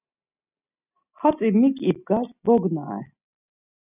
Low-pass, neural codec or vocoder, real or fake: 3.6 kHz; none; real